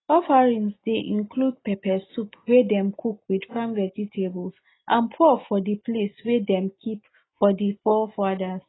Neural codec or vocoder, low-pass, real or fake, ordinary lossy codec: none; 7.2 kHz; real; AAC, 16 kbps